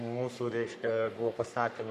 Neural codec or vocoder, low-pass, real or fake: codec, 44.1 kHz, 3.4 kbps, Pupu-Codec; 14.4 kHz; fake